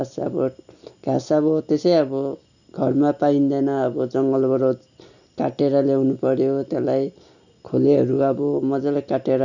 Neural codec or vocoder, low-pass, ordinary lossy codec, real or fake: none; 7.2 kHz; none; real